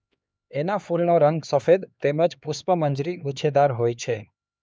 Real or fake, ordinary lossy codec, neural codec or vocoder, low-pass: fake; none; codec, 16 kHz, 2 kbps, X-Codec, HuBERT features, trained on LibriSpeech; none